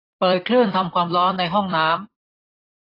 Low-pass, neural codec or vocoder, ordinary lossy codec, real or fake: 5.4 kHz; vocoder, 44.1 kHz, 128 mel bands every 256 samples, BigVGAN v2; AAC, 24 kbps; fake